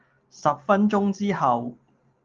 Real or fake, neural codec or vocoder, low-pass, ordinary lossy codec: real; none; 7.2 kHz; Opus, 24 kbps